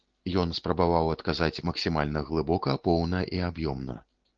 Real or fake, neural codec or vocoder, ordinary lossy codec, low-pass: real; none; Opus, 16 kbps; 7.2 kHz